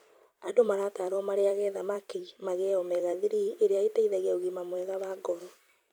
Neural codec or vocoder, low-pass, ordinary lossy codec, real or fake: vocoder, 44.1 kHz, 128 mel bands every 256 samples, BigVGAN v2; none; none; fake